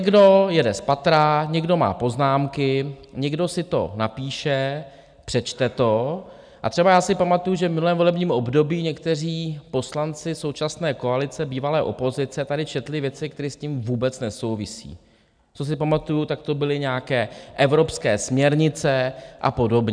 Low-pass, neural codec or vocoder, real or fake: 9.9 kHz; none; real